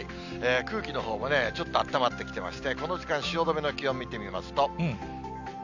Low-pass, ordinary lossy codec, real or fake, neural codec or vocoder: 7.2 kHz; none; real; none